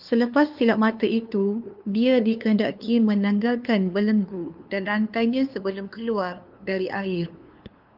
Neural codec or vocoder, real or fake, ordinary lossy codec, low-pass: codec, 16 kHz, 2 kbps, FunCodec, trained on LibriTTS, 25 frames a second; fake; Opus, 24 kbps; 5.4 kHz